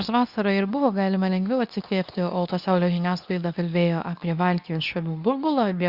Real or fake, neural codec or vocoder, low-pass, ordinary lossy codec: fake; codec, 24 kHz, 0.9 kbps, WavTokenizer, medium speech release version 2; 5.4 kHz; Opus, 64 kbps